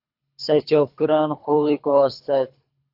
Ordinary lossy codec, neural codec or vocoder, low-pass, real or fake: AAC, 48 kbps; codec, 24 kHz, 3 kbps, HILCodec; 5.4 kHz; fake